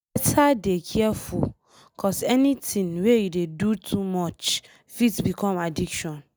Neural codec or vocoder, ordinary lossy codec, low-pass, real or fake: none; none; none; real